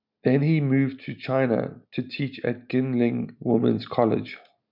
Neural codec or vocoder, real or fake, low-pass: none; real; 5.4 kHz